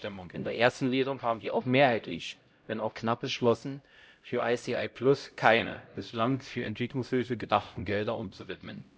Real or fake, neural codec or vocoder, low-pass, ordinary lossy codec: fake; codec, 16 kHz, 0.5 kbps, X-Codec, HuBERT features, trained on LibriSpeech; none; none